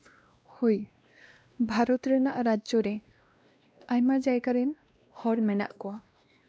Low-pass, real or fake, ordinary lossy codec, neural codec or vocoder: none; fake; none; codec, 16 kHz, 1 kbps, X-Codec, WavLM features, trained on Multilingual LibriSpeech